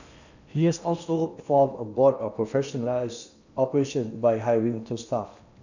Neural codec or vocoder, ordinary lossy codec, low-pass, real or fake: codec, 16 kHz in and 24 kHz out, 0.8 kbps, FocalCodec, streaming, 65536 codes; none; 7.2 kHz; fake